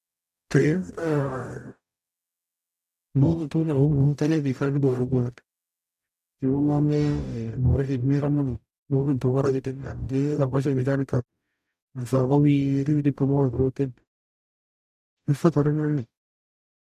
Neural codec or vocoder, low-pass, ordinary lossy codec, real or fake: codec, 44.1 kHz, 0.9 kbps, DAC; 14.4 kHz; none; fake